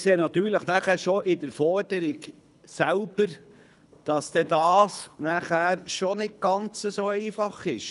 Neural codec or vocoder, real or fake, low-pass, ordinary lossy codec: codec, 24 kHz, 3 kbps, HILCodec; fake; 10.8 kHz; none